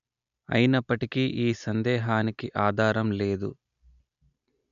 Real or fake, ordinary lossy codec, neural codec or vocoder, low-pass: real; none; none; 7.2 kHz